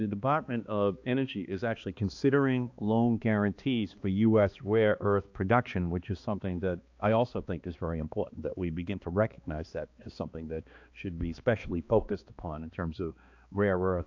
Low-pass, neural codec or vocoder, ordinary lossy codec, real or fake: 7.2 kHz; codec, 16 kHz, 2 kbps, X-Codec, HuBERT features, trained on balanced general audio; AAC, 48 kbps; fake